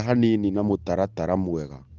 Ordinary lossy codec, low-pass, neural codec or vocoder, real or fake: Opus, 16 kbps; 7.2 kHz; none; real